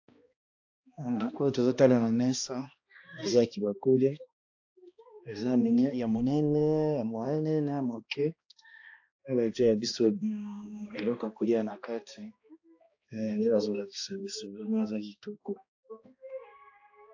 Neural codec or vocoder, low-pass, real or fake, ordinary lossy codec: codec, 16 kHz, 1 kbps, X-Codec, HuBERT features, trained on balanced general audio; 7.2 kHz; fake; AAC, 48 kbps